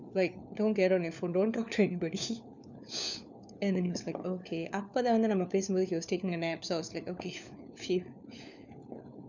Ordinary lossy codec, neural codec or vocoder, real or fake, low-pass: none; codec, 16 kHz, 4 kbps, FunCodec, trained on LibriTTS, 50 frames a second; fake; 7.2 kHz